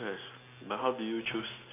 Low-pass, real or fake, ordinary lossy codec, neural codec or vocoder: 3.6 kHz; real; AAC, 24 kbps; none